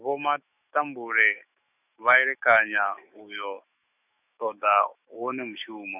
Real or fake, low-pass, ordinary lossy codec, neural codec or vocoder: fake; 3.6 kHz; none; autoencoder, 48 kHz, 128 numbers a frame, DAC-VAE, trained on Japanese speech